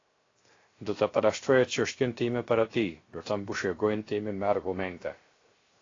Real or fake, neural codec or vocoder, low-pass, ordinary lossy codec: fake; codec, 16 kHz, 0.3 kbps, FocalCodec; 7.2 kHz; AAC, 32 kbps